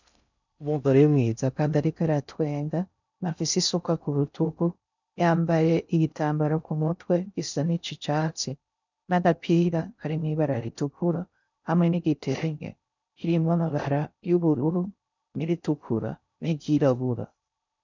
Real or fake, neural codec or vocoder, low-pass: fake; codec, 16 kHz in and 24 kHz out, 0.6 kbps, FocalCodec, streaming, 4096 codes; 7.2 kHz